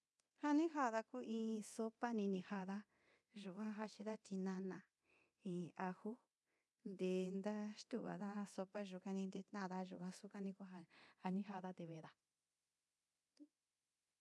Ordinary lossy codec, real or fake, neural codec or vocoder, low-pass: none; fake; codec, 24 kHz, 0.9 kbps, DualCodec; none